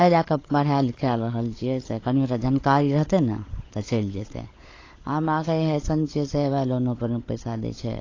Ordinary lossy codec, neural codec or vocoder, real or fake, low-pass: AAC, 32 kbps; codec, 16 kHz, 16 kbps, FunCodec, trained on LibriTTS, 50 frames a second; fake; 7.2 kHz